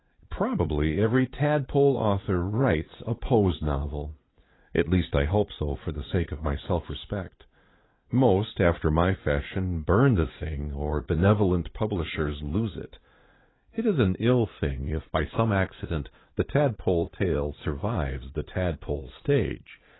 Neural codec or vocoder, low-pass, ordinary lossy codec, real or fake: vocoder, 44.1 kHz, 80 mel bands, Vocos; 7.2 kHz; AAC, 16 kbps; fake